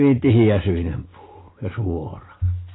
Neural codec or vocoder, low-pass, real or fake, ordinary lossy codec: none; 7.2 kHz; real; AAC, 16 kbps